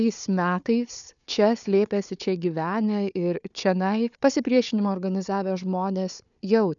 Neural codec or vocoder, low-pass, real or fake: codec, 16 kHz, 4 kbps, FunCodec, trained on Chinese and English, 50 frames a second; 7.2 kHz; fake